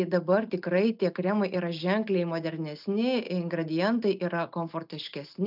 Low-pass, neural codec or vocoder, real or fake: 5.4 kHz; none; real